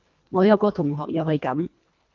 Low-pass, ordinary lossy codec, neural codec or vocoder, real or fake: 7.2 kHz; Opus, 24 kbps; codec, 24 kHz, 1.5 kbps, HILCodec; fake